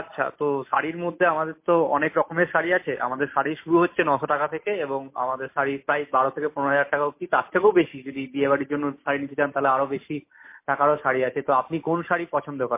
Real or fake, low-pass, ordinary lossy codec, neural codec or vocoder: real; 3.6 kHz; MP3, 24 kbps; none